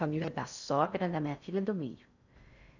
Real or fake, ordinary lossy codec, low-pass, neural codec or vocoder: fake; none; 7.2 kHz; codec, 16 kHz in and 24 kHz out, 0.6 kbps, FocalCodec, streaming, 4096 codes